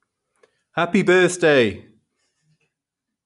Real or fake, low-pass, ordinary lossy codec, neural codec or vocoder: real; 10.8 kHz; none; none